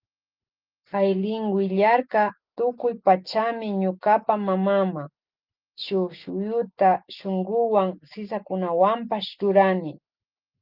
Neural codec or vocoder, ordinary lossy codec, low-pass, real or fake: none; Opus, 24 kbps; 5.4 kHz; real